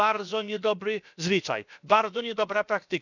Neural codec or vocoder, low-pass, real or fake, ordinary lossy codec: codec, 16 kHz, about 1 kbps, DyCAST, with the encoder's durations; 7.2 kHz; fake; none